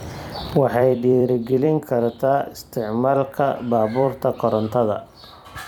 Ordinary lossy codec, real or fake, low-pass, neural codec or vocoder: none; fake; 19.8 kHz; vocoder, 44.1 kHz, 128 mel bands every 256 samples, BigVGAN v2